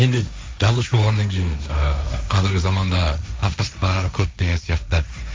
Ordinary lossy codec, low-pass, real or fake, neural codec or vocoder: none; none; fake; codec, 16 kHz, 1.1 kbps, Voila-Tokenizer